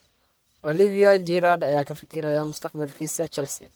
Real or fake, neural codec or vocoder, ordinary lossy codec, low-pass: fake; codec, 44.1 kHz, 1.7 kbps, Pupu-Codec; none; none